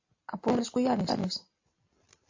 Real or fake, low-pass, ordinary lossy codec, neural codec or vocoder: real; 7.2 kHz; AAC, 48 kbps; none